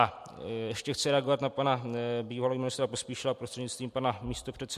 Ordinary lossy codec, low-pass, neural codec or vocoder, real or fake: MP3, 64 kbps; 14.4 kHz; vocoder, 44.1 kHz, 128 mel bands every 256 samples, BigVGAN v2; fake